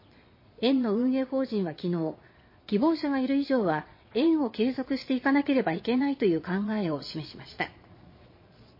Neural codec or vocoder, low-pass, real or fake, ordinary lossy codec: vocoder, 22.05 kHz, 80 mel bands, Vocos; 5.4 kHz; fake; MP3, 24 kbps